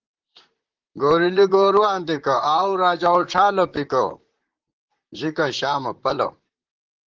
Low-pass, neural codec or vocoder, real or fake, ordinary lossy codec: 7.2 kHz; vocoder, 44.1 kHz, 128 mel bands, Pupu-Vocoder; fake; Opus, 16 kbps